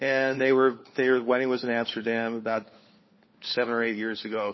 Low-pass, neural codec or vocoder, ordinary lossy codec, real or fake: 7.2 kHz; codec, 16 kHz, 4 kbps, FunCodec, trained on LibriTTS, 50 frames a second; MP3, 24 kbps; fake